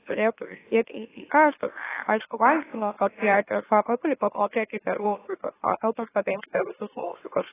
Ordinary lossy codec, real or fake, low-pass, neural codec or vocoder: AAC, 16 kbps; fake; 3.6 kHz; autoencoder, 44.1 kHz, a latent of 192 numbers a frame, MeloTTS